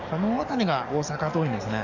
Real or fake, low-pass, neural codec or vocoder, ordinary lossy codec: fake; 7.2 kHz; codec, 44.1 kHz, 7.8 kbps, DAC; none